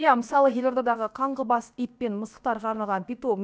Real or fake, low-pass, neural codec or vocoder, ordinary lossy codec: fake; none; codec, 16 kHz, about 1 kbps, DyCAST, with the encoder's durations; none